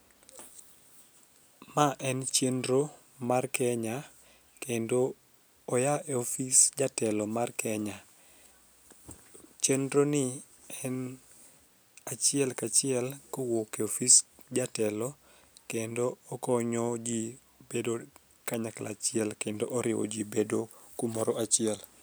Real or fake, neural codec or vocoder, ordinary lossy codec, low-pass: real; none; none; none